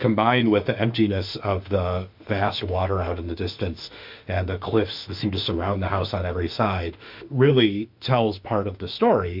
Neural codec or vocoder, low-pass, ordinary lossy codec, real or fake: autoencoder, 48 kHz, 32 numbers a frame, DAC-VAE, trained on Japanese speech; 5.4 kHz; MP3, 48 kbps; fake